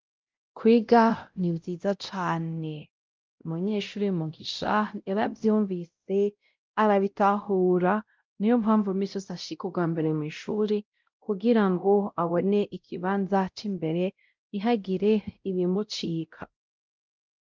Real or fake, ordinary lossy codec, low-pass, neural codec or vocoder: fake; Opus, 32 kbps; 7.2 kHz; codec, 16 kHz, 0.5 kbps, X-Codec, WavLM features, trained on Multilingual LibriSpeech